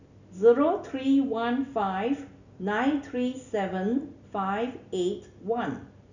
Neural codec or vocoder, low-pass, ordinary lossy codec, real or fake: none; 7.2 kHz; none; real